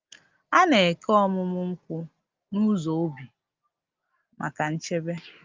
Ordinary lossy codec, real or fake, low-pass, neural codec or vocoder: Opus, 32 kbps; real; 7.2 kHz; none